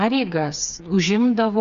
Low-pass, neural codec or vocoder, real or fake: 7.2 kHz; codec, 16 kHz, 4 kbps, FreqCodec, smaller model; fake